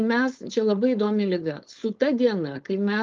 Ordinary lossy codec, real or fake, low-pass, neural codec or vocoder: Opus, 32 kbps; fake; 7.2 kHz; codec, 16 kHz, 4.8 kbps, FACodec